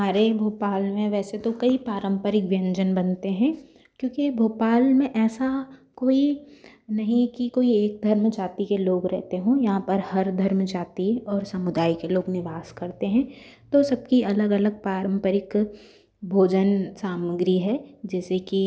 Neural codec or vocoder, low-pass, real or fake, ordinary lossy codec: none; none; real; none